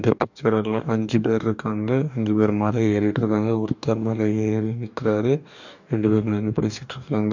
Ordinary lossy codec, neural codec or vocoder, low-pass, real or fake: none; codec, 44.1 kHz, 2.6 kbps, DAC; 7.2 kHz; fake